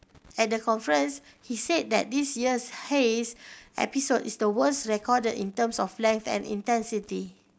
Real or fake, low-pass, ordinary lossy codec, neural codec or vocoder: real; none; none; none